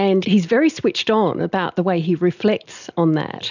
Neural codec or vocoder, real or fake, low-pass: none; real; 7.2 kHz